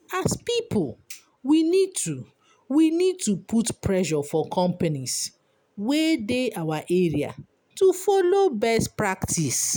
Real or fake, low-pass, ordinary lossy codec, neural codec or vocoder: real; none; none; none